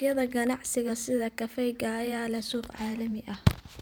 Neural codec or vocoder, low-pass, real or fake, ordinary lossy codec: vocoder, 44.1 kHz, 128 mel bands every 512 samples, BigVGAN v2; none; fake; none